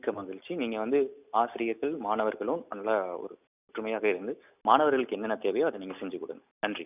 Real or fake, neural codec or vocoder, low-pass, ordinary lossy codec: real; none; 3.6 kHz; none